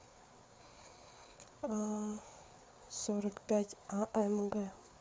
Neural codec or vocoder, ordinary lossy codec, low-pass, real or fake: codec, 16 kHz, 4 kbps, FreqCodec, larger model; none; none; fake